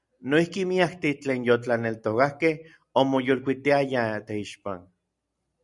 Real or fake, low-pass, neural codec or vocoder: real; 10.8 kHz; none